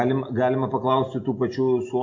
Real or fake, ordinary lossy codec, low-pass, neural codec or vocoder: real; MP3, 48 kbps; 7.2 kHz; none